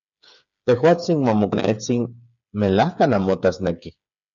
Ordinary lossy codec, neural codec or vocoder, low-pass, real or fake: MP3, 96 kbps; codec, 16 kHz, 8 kbps, FreqCodec, smaller model; 7.2 kHz; fake